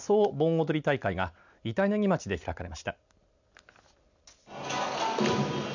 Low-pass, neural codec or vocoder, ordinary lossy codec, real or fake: 7.2 kHz; none; none; real